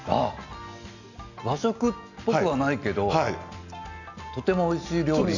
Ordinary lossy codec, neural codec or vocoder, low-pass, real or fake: none; none; 7.2 kHz; real